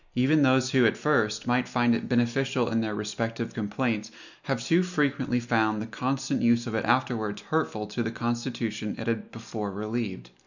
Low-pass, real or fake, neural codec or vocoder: 7.2 kHz; real; none